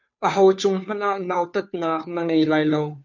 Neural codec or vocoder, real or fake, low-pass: codec, 16 kHz, 2 kbps, FunCodec, trained on Chinese and English, 25 frames a second; fake; 7.2 kHz